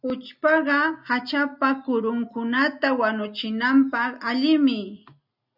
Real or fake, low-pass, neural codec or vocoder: real; 5.4 kHz; none